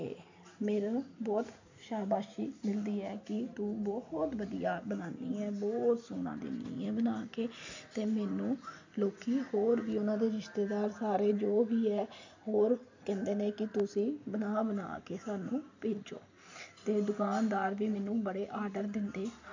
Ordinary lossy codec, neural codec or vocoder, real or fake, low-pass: AAC, 48 kbps; none; real; 7.2 kHz